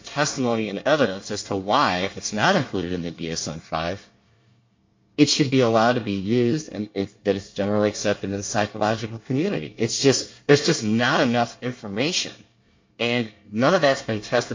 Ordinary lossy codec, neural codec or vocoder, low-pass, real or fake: MP3, 48 kbps; codec, 24 kHz, 1 kbps, SNAC; 7.2 kHz; fake